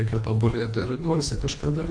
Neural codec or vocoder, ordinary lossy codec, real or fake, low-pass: codec, 24 kHz, 1.5 kbps, HILCodec; AAC, 48 kbps; fake; 9.9 kHz